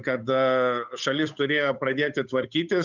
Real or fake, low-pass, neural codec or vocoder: fake; 7.2 kHz; codec, 16 kHz, 8 kbps, FunCodec, trained on Chinese and English, 25 frames a second